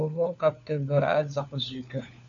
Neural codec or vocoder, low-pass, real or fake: codec, 16 kHz, 4 kbps, FunCodec, trained on LibriTTS, 50 frames a second; 7.2 kHz; fake